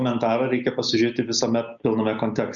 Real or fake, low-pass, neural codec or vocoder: real; 7.2 kHz; none